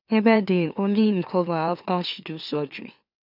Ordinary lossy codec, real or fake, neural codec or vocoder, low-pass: none; fake; autoencoder, 44.1 kHz, a latent of 192 numbers a frame, MeloTTS; 5.4 kHz